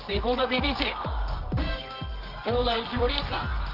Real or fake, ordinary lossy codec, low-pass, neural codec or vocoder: fake; Opus, 32 kbps; 5.4 kHz; codec, 24 kHz, 0.9 kbps, WavTokenizer, medium music audio release